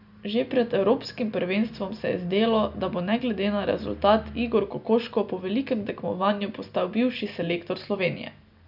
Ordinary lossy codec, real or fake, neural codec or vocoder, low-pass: none; real; none; 5.4 kHz